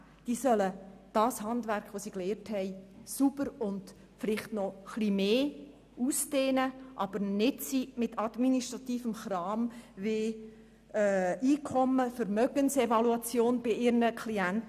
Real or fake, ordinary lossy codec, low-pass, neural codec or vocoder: real; none; 14.4 kHz; none